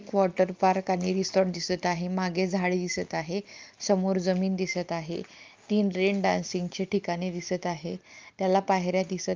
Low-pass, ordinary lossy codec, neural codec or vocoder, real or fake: 7.2 kHz; Opus, 24 kbps; none; real